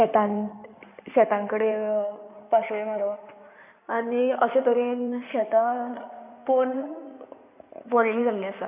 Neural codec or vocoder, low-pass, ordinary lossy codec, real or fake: codec, 16 kHz in and 24 kHz out, 2.2 kbps, FireRedTTS-2 codec; 3.6 kHz; none; fake